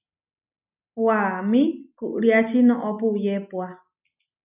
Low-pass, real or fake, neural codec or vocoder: 3.6 kHz; real; none